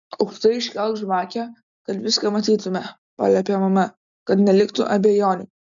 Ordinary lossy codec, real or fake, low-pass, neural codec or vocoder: MP3, 96 kbps; real; 7.2 kHz; none